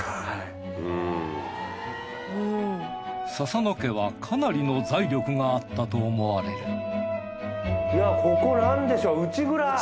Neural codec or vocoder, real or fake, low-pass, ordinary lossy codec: none; real; none; none